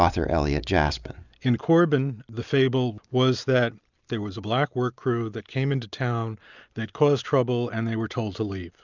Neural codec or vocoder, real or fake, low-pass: none; real; 7.2 kHz